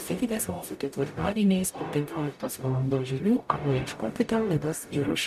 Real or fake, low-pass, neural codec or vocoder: fake; 14.4 kHz; codec, 44.1 kHz, 0.9 kbps, DAC